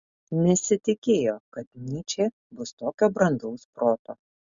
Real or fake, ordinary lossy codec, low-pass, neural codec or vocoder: real; MP3, 96 kbps; 7.2 kHz; none